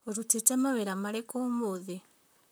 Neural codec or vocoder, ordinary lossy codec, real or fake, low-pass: vocoder, 44.1 kHz, 128 mel bands, Pupu-Vocoder; none; fake; none